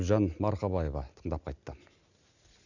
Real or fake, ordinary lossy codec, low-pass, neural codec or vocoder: real; none; 7.2 kHz; none